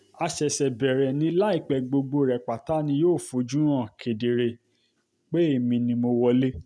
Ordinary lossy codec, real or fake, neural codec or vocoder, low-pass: none; real; none; none